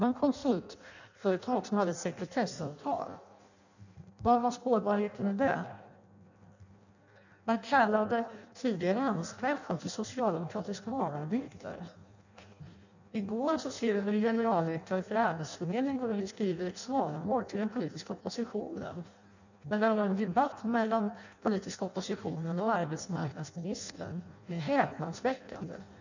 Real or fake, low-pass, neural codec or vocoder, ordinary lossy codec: fake; 7.2 kHz; codec, 16 kHz in and 24 kHz out, 0.6 kbps, FireRedTTS-2 codec; none